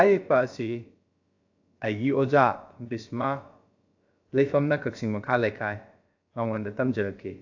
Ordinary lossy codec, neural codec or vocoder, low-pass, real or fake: AAC, 48 kbps; codec, 16 kHz, about 1 kbps, DyCAST, with the encoder's durations; 7.2 kHz; fake